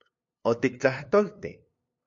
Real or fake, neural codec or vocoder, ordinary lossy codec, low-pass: fake; codec, 16 kHz, 2 kbps, FunCodec, trained on LibriTTS, 25 frames a second; MP3, 48 kbps; 7.2 kHz